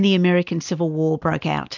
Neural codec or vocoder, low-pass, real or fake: none; 7.2 kHz; real